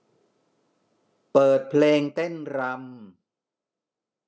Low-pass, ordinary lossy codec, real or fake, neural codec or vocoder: none; none; real; none